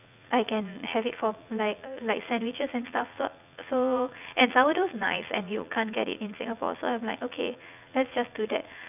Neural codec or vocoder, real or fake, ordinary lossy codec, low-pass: vocoder, 44.1 kHz, 80 mel bands, Vocos; fake; none; 3.6 kHz